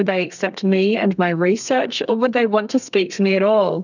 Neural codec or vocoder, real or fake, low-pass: codec, 32 kHz, 1.9 kbps, SNAC; fake; 7.2 kHz